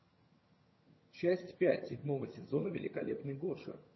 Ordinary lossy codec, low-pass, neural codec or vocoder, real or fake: MP3, 24 kbps; 7.2 kHz; vocoder, 22.05 kHz, 80 mel bands, HiFi-GAN; fake